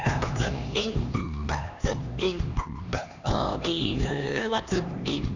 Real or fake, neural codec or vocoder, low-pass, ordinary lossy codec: fake; codec, 16 kHz, 2 kbps, X-Codec, HuBERT features, trained on LibriSpeech; 7.2 kHz; none